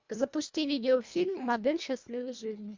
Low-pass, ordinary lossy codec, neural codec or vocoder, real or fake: 7.2 kHz; MP3, 64 kbps; codec, 24 kHz, 1.5 kbps, HILCodec; fake